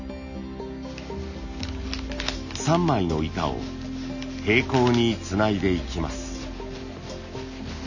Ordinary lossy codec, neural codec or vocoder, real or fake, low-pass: none; none; real; 7.2 kHz